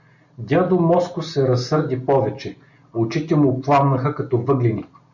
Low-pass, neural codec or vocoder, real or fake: 7.2 kHz; none; real